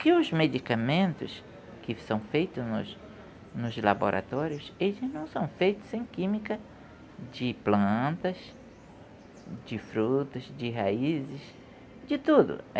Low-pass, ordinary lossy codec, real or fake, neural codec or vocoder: none; none; real; none